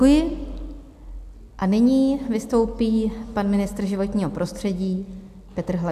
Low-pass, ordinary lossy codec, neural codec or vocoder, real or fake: 14.4 kHz; AAC, 96 kbps; none; real